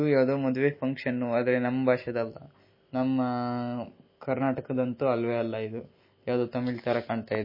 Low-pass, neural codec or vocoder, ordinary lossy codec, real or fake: 5.4 kHz; none; MP3, 24 kbps; real